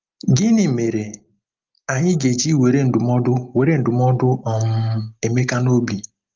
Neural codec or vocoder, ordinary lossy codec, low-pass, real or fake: none; Opus, 24 kbps; 7.2 kHz; real